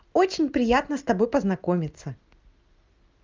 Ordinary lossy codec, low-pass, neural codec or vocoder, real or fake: Opus, 24 kbps; 7.2 kHz; none; real